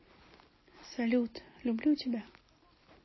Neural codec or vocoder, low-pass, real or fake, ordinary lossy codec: none; 7.2 kHz; real; MP3, 24 kbps